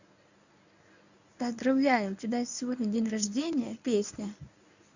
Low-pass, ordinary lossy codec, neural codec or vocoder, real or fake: 7.2 kHz; none; codec, 24 kHz, 0.9 kbps, WavTokenizer, medium speech release version 1; fake